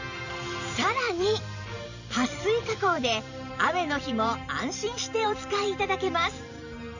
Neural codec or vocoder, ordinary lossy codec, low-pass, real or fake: vocoder, 44.1 kHz, 128 mel bands every 512 samples, BigVGAN v2; none; 7.2 kHz; fake